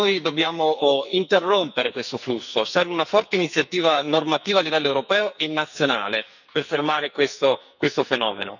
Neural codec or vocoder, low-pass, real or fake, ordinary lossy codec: codec, 44.1 kHz, 2.6 kbps, SNAC; 7.2 kHz; fake; none